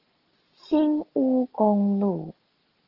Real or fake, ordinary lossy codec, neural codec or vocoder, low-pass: real; Opus, 16 kbps; none; 5.4 kHz